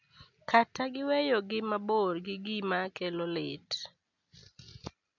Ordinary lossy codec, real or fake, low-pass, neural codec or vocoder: none; real; 7.2 kHz; none